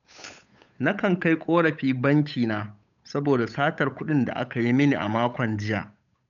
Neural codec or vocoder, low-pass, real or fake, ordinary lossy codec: codec, 16 kHz, 8 kbps, FunCodec, trained on Chinese and English, 25 frames a second; 7.2 kHz; fake; none